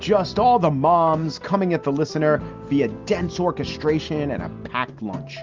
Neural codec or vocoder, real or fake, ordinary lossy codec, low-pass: none; real; Opus, 24 kbps; 7.2 kHz